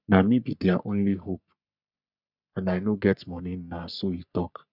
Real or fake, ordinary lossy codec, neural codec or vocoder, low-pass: fake; none; codec, 44.1 kHz, 3.4 kbps, Pupu-Codec; 5.4 kHz